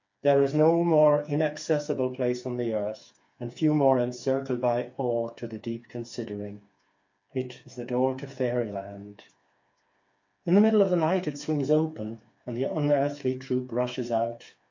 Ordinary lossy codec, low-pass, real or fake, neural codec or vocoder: MP3, 48 kbps; 7.2 kHz; fake; codec, 16 kHz, 4 kbps, FreqCodec, smaller model